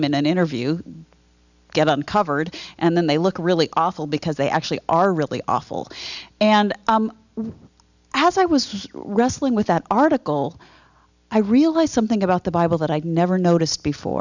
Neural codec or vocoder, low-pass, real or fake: none; 7.2 kHz; real